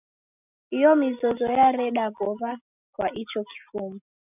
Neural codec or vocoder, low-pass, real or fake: none; 3.6 kHz; real